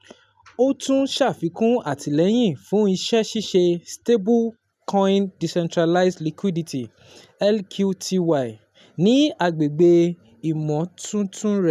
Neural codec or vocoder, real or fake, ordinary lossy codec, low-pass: none; real; none; 14.4 kHz